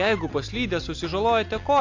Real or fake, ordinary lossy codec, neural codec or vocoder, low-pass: real; AAC, 48 kbps; none; 7.2 kHz